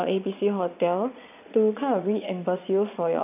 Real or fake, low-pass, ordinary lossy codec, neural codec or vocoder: fake; 3.6 kHz; none; vocoder, 22.05 kHz, 80 mel bands, Vocos